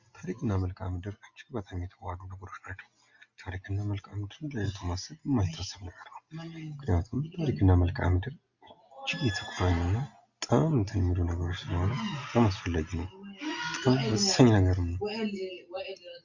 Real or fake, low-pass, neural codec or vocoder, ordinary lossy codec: real; 7.2 kHz; none; Opus, 64 kbps